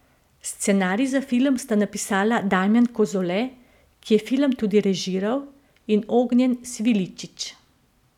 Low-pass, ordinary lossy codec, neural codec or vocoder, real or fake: 19.8 kHz; none; none; real